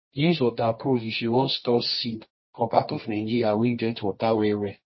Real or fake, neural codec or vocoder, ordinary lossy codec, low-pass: fake; codec, 24 kHz, 0.9 kbps, WavTokenizer, medium music audio release; MP3, 24 kbps; 7.2 kHz